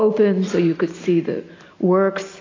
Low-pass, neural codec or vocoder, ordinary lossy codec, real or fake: 7.2 kHz; none; AAC, 32 kbps; real